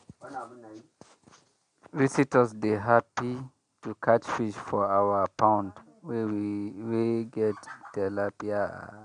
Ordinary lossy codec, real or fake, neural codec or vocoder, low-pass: MP3, 64 kbps; real; none; 9.9 kHz